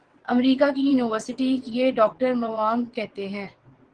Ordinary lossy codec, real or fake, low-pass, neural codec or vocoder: Opus, 16 kbps; fake; 9.9 kHz; vocoder, 22.05 kHz, 80 mel bands, WaveNeXt